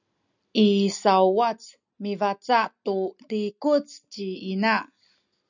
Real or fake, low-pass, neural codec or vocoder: real; 7.2 kHz; none